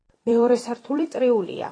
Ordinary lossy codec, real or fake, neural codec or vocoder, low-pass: AAC, 32 kbps; fake; vocoder, 44.1 kHz, 128 mel bands every 256 samples, BigVGAN v2; 9.9 kHz